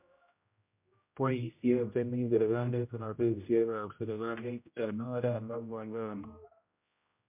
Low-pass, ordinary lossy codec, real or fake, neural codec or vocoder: 3.6 kHz; MP3, 32 kbps; fake; codec, 16 kHz, 0.5 kbps, X-Codec, HuBERT features, trained on general audio